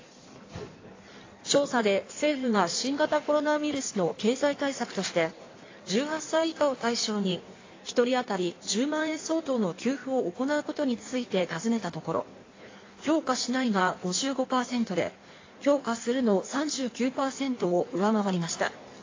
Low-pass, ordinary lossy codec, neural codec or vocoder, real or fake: 7.2 kHz; AAC, 32 kbps; codec, 16 kHz in and 24 kHz out, 1.1 kbps, FireRedTTS-2 codec; fake